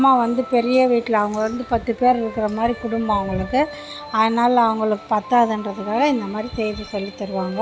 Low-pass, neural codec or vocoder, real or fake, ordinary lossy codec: none; none; real; none